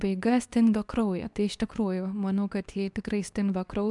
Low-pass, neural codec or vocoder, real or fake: 10.8 kHz; codec, 24 kHz, 0.9 kbps, WavTokenizer, medium speech release version 2; fake